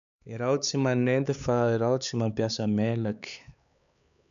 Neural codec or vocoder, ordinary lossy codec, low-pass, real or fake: codec, 16 kHz, 4 kbps, X-Codec, HuBERT features, trained on LibriSpeech; MP3, 96 kbps; 7.2 kHz; fake